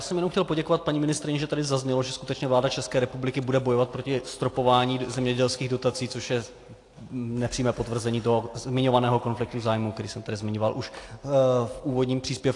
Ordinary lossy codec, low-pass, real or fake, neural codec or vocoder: AAC, 48 kbps; 10.8 kHz; real; none